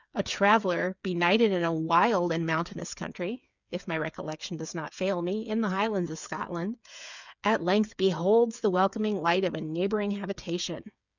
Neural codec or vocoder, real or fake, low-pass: codec, 16 kHz, 8 kbps, FreqCodec, smaller model; fake; 7.2 kHz